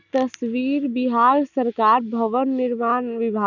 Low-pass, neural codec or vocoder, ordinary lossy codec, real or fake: 7.2 kHz; none; none; real